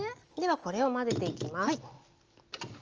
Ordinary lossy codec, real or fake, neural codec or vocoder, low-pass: Opus, 24 kbps; real; none; 7.2 kHz